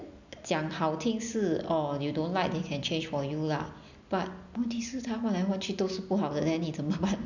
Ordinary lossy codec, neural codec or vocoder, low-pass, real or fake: none; none; 7.2 kHz; real